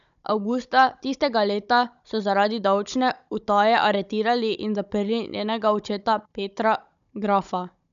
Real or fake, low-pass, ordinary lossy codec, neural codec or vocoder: fake; 7.2 kHz; none; codec, 16 kHz, 16 kbps, FunCodec, trained on Chinese and English, 50 frames a second